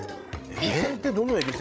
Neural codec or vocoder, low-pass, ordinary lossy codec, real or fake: codec, 16 kHz, 16 kbps, FreqCodec, larger model; none; none; fake